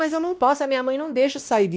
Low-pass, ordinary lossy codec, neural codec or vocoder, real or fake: none; none; codec, 16 kHz, 1 kbps, X-Codec, WavLM features, trained on Multilingual LibriSpeech; fake